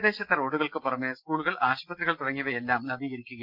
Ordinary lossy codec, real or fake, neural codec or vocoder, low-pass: Opus, 32 kbps; real; none; 5.4 kHz